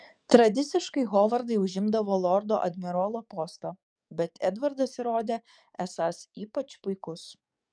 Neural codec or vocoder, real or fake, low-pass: codec, 44.1 kHz, 7.8 kbps, DAC; fake; 9.9 kHz